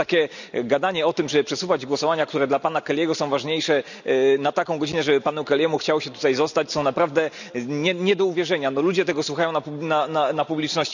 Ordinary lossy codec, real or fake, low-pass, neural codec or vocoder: none; real; 7.2 kHz; none